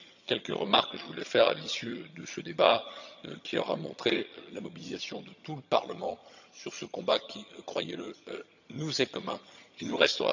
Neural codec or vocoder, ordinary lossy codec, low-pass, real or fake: vocoder, 22.05 kHz, 80 mel bands, HiFi-GAN; none; 7.2 kHz; fake